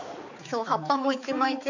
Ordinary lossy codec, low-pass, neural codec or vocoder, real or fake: none; 7.2 kHz; codec, 16 kHz, 4 kbps, X-Codec, HuBERT features, trained on balanced general audio; fake